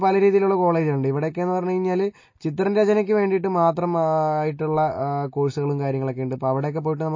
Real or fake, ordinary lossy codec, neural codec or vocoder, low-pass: real; MP3, 32 kbps; none; 7.2 kHz